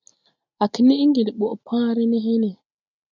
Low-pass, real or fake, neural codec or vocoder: 7.2 kHz; real; none